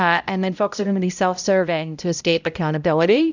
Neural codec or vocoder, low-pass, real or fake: codec, 16 kHz, 0.5 kbps, X-Codec, HuBERT features, trained on balanced general audio; 7.2 kHz; fake